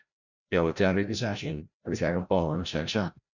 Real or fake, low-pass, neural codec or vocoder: fake; 7.2 kHz; codec, 16 kHz, 0.5 kbps, FreqCodec, larger model